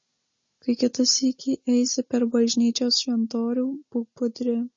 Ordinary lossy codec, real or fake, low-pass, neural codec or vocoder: MP3, 32 kbps; real; 7.2 kHz; none